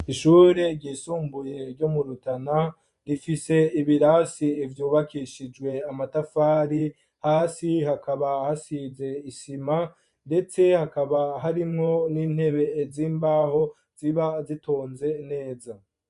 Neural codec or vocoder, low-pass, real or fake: vocoder, 24 kHz, 100 mel bands, Vocos; 10.8 kHz; fake